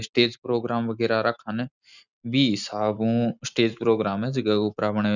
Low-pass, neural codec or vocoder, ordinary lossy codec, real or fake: 7.2 kHz; none; none; real